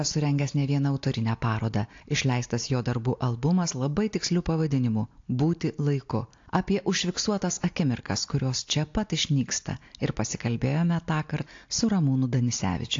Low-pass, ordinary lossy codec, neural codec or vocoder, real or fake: 7.2 kHz; AAC, 48 kbps; none; real